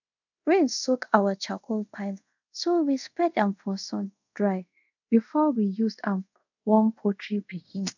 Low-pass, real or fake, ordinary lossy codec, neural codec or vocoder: 7.2 kHz; fake; none; codec, 24 kHz, 0.5 kbps, DualCodec